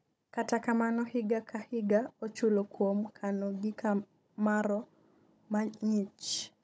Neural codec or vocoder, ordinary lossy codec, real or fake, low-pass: codec, 16 kHz, 16 kbps, FunCodec, trained on Chinese and English, 50 frames a second; none; fake; none